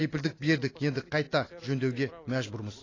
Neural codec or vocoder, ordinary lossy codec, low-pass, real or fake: none; AAC, 32 kbps; 7.2 kHz; real